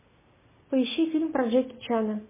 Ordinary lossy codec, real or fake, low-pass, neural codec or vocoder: MP3, 16 kbps; real; 3.6 kHz; none